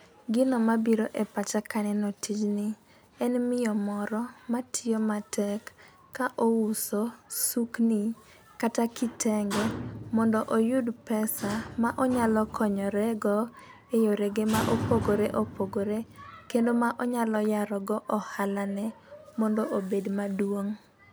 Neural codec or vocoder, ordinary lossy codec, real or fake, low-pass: none; none; real; none